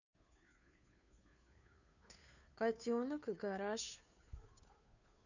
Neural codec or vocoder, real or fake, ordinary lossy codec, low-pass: codec, 16 kHz, 2 kbps, FunCodec, trained on Chinese and English, 25 frames a second; fake; none; 7.2 kHz